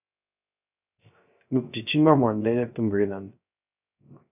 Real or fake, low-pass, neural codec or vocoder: fake; 3.6 kHz; codec, 16 kHz, 0.3 kbps, FocalCodec